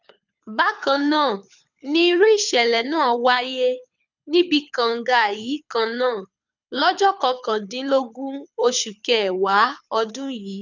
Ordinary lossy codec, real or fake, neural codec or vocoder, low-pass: none; fake; codec, 24 kHz, 6 kbps, HILCodec; 7.2 kHz